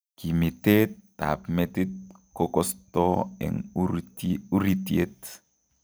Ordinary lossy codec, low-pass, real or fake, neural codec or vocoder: none; none; real; none